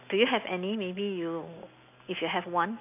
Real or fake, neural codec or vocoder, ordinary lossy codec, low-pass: real; none; none; 3.6 kHz